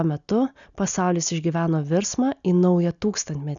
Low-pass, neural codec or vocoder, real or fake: 7.2 kHz; none; real